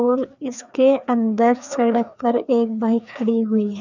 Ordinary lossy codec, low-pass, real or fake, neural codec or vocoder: none; 7.2 kHz; fake; codec, 16 kHz, 2 kbps, FreqCodec, larger model